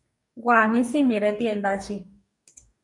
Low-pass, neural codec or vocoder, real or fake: 10.8 kHz; codec, 44.1 kHz, 2.6 kbps, DAC; fake